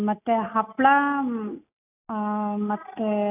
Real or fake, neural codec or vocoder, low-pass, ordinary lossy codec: real; none; 3.6 kHz; AAC, 32 kbps